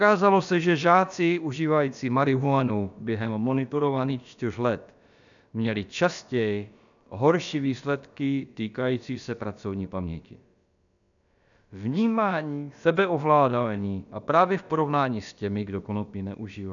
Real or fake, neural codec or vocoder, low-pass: fake; codec, 16 kHz, about 1 kbps, DyCAST, with the encoder's durations; 7.2 kHz